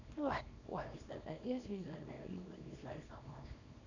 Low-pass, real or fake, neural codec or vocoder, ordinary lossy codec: 7.2 kHz; fake; codec, 24 kHz, 0.9 kbps, WavTokenizer, small release; none